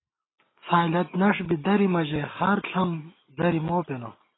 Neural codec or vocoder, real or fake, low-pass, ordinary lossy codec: none; real; 7.2 kHz; AAC, 16 kbps